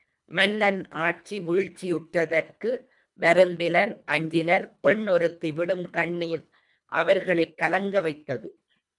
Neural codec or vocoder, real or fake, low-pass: codec, 24 kHz, 1.5 kbps, HILCodec; fake; 10.8 kHz